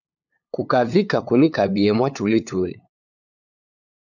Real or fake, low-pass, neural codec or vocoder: fake; 7.2 kHz; codec, 16 kHz, 8 kbps, FunCodec, trained on LibriTTS, 25 frames a second